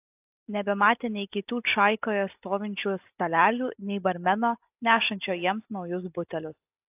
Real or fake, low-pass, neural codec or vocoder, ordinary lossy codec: real; 3.6 kHz; none; AAC, 32 kbps